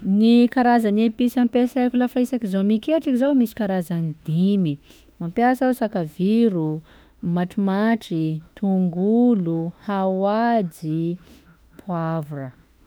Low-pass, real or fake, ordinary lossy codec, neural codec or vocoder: none; fake; none; autoencoder, 48 kHz, 32 numbers a frame, DAC-VAE, trained on Japanese speech